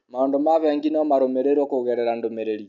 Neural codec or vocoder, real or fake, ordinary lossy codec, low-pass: none; real; none; 7.2 kHz